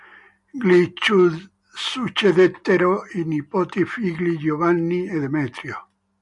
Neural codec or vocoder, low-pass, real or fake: none; 10.8 kHz; real